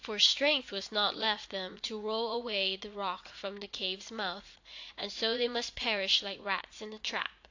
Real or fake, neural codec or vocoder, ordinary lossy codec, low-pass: fake; vocoder, 44.1 kHz, 80 mel bands, Vocos; AAC, 48 kbps; 7.2 kHz